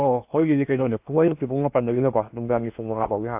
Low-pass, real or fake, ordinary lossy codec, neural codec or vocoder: 3.6 kHz; fake; AAC, 32 kbps; codec, 16 kHz in and 24 kHz out, 0.8 kbps, FocalCodec, streaming, 65536 codes